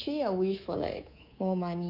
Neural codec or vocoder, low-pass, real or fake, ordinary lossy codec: codec, 24 kHz, 3.1 kbps, DualCodec; 5.4 kHz; fake; none